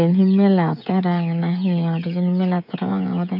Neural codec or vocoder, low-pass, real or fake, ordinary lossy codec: codec, 16 kHz, 16 kbps, FreqCodec, smaller model; 5.4 kHz; fake; none